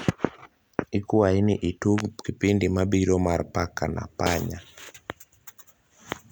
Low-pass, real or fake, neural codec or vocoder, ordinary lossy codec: none; real; none; none